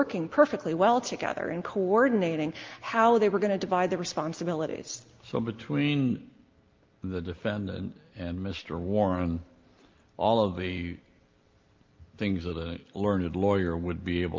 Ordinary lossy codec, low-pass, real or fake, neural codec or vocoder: Opus, 32 kbps; 7.2 kHz; real; none